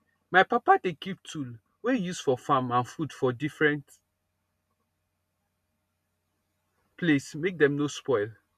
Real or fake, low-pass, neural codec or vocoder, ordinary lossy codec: real; 14.4 kHz; none; none